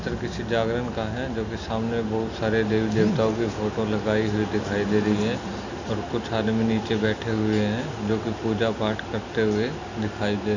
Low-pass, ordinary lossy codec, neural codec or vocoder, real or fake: 7.2 kHz; none; none; real